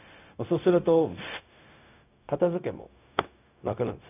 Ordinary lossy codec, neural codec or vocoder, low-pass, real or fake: none; codec, 16 kHz, 0.4 kbps, LongCat-Audio-Codec; 3.6 kHz; fake